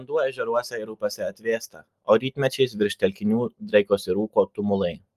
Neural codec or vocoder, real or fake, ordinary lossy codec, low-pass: none; real; Opus, 32 kbps; 14.4 kHz